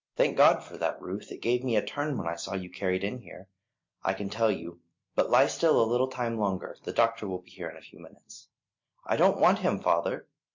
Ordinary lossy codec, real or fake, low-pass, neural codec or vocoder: MP3, 48 kbps; real; 7.2 kHz; none